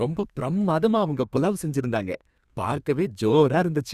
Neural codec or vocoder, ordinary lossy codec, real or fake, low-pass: codec, 32 kHz, 1.9 kbps, SNAC; Opus, 64 kbps; fake; 14.4 kHz